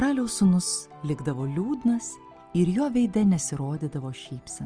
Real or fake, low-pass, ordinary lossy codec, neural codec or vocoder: real; 9.9 kHz; Opus, 24 kbps; none